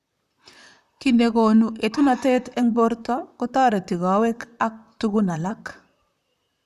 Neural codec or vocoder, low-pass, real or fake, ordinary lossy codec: vocoder, 44.1 kHz, 128 mel bands, Pupu-Vocoder; 14.4 kHz; fake; none